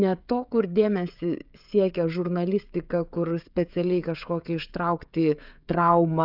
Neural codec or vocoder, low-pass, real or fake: codec, 16 kHz, 16 kbps, FreqCodec, smaller model; 5.4 kHz; fake